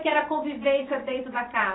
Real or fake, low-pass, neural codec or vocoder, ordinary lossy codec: fake; 7.2 kHz; vocoder, 44.1 kHz, 128 mel bands every 256 samples, BigVGAN v2; AAC, 16 kbps